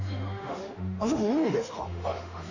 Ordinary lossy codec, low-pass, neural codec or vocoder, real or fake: none; 7.2 kHz; autoencoder, 48 kHz, 32 numbers a frame, DAC-VAE, trained on Japanese speech; fake